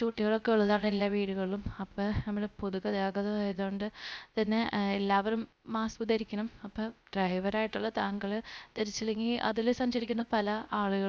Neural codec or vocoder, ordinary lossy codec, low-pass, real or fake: codec, 16 kHz, 0.3 kbps, FocalCodec; none; none; fake